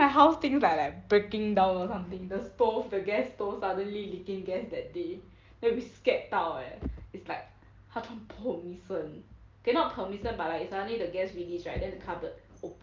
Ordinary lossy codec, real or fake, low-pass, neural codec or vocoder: Opus, 24 kbps; real; 7.2 kHz; none